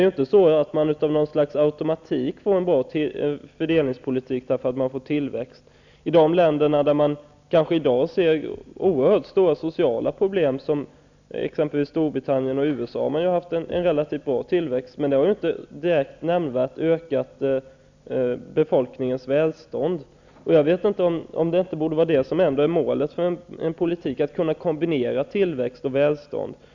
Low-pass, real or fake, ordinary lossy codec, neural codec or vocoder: 7.2 kHz; real; none; none